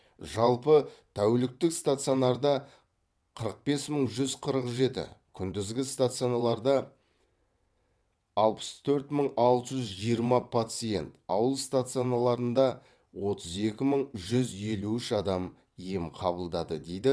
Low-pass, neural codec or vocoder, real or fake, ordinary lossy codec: none; vocoder, 22.05 kHz, 80 mel bands, WaveNeXt; fake; none